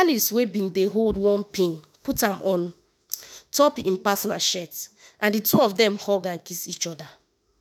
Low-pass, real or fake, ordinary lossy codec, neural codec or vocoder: none; fake; none; autoencoder, 48 kHz, 32 numbers a frame, DAC-VAE, trained on Japanese speech